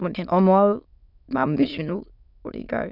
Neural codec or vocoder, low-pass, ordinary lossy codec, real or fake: autoencoder, 22.05 kHz, a latent of 192 numbers a frame, VITS, trained on many speakers; 5.4 kHz; none; fake